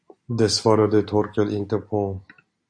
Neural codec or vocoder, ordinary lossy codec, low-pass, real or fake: none; AAC, 64 kbps; 9.9 kHz; real